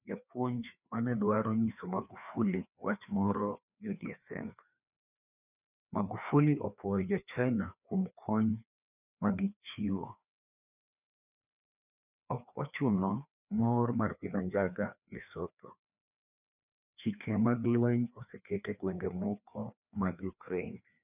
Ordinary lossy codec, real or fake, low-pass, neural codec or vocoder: none; fake; 3.6 kHz; codec, 16 kHz, 2 kbps, FreqCodec, larger model